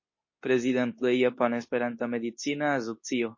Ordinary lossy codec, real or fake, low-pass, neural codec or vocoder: MP3, 32 kbps; fake; 7.2 kHz; codec, 24 kHz, 0.9 kbps, WavTokenizer, medium speech release version 2